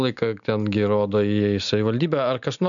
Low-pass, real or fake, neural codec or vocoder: 7.2 kHz; real; none